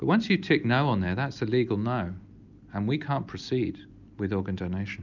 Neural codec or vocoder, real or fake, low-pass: none; real; 7.2 kHz